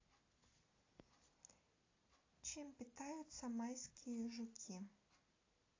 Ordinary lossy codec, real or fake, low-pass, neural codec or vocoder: AAC, 32 kbps; real; 7.2 kHz; none